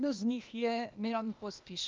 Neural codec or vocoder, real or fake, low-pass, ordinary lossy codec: codec, 16 kHz, 0.8 kbps, ZipCodec; fake; 7.2 kHz; Opus, 24 kbps